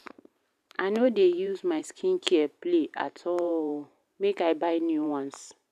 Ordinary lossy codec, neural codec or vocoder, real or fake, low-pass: none; vocoder, 44.1 kHz, 128 mel bands every 512 samples, BigVGAN v2; fake; 14.4 kHz